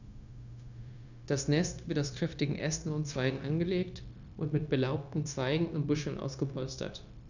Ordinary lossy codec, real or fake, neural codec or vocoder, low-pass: none; fake; codec, 16 kHz, 0.9 kbps, LongCat-Audio-Codec; 7.2 kHz